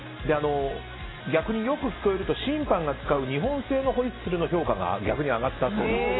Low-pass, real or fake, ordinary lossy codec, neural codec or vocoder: 7.2 kHz; real; AAC, 16 kbps; none